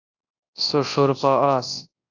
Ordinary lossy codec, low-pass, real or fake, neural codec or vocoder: AAC, 48 kbps; 7.2 kHz; fake; codec, 24 kHz, 0.9 kbps, WavTokenizer, large speech release